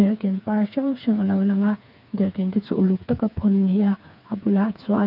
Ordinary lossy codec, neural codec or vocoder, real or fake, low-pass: none; codec, 16 kHz, 4 kbps, FreqCodec, smaller model; fake; 5.4 kHz